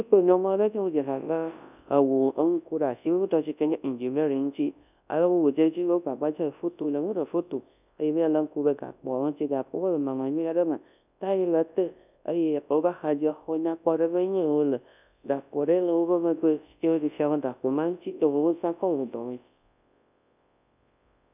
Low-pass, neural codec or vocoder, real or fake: 3.6 kHz; codec, 24 kHz, 0.9 kbps, WavTokenizer, large speech release; fake